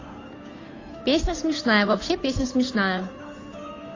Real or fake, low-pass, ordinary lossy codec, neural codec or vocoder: fake; 7.2 kHz; AAC, 32 kbps; codec, 16 kHz, 8 kbps, FunCodec, trained on Chinese and English, 25 frames a second